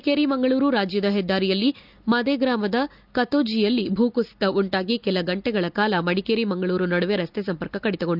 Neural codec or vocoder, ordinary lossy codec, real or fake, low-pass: none; none; real; 5.4 kHz